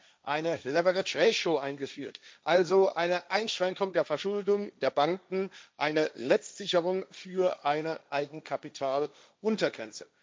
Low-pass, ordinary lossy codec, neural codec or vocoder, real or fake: none; none; codec, 16 kHz, 1.1 kbps, Voila-Tokenizer; fake